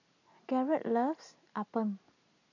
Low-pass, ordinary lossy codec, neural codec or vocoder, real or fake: 7.2 kHz; none; none; real